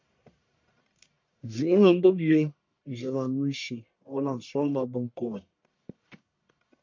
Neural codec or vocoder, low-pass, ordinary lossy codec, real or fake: codec, 44.1 kHz, 1.7 kbps, Pupu-Codec; 7.2 kHz; MP3, 48 kbps; fake